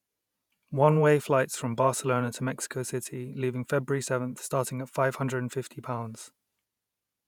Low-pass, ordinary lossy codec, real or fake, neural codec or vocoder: 19.8 kHz; none; fake; vocoder, 48 kHz, 128 mel bands, Vocos